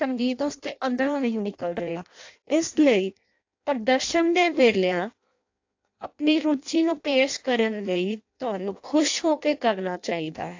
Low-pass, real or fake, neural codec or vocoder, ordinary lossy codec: 7.2 kHz; fake; codec, 16 kHz in and 24 kHz out, 0.6 kbps, FireRedTTS-2 codec; AAC, 48 kbps